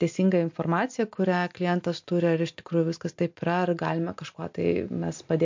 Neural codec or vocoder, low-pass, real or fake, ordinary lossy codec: none; 7.2 kHz; real; MP3, 48 kbps